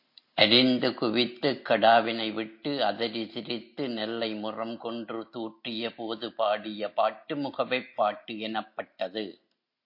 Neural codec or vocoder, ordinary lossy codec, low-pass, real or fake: none; MP3, 32 kbps; 5.4 kHz; real